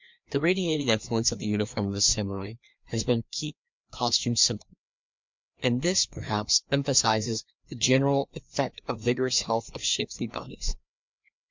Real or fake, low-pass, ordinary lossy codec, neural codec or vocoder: fake; 7.2 kHz; MP3, 64 kbps; codec, 16 kHz, 2 kbps, FreqCodec, larger model